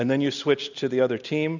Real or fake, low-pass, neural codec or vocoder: real; 7.2 kHz; none